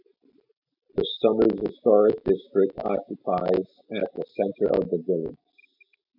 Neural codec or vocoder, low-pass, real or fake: none; 5.4 kHz; real